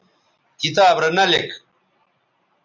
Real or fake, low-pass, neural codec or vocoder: real; 7.2 kHz; none